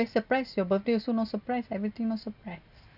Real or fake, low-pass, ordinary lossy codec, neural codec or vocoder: real; 5.4 kHz; none; none